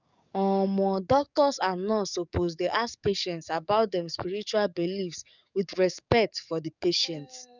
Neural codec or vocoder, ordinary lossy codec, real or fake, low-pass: codec, 44.1 kHz, 7.8 kbps, DAC; none; fake; 7.2 kHz